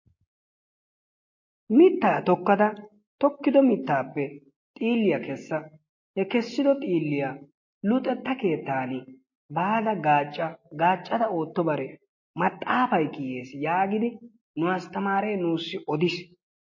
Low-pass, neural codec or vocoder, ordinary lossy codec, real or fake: 7.2 kHz; none; MP3, 32 kbps; real